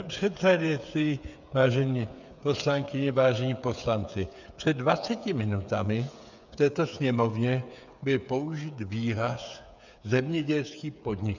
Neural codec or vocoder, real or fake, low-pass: codec, 16 kHz, 8 kbps, FreqCodec, smaller model; fake; 7.2 kHz